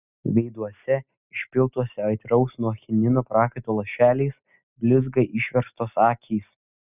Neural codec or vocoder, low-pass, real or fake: none; 3.6 kHz; real